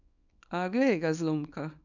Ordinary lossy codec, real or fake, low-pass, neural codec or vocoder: none; fake; 7.2 kHz; codec, 24 kHz, 0.9 kbps, WavTokenizer, small release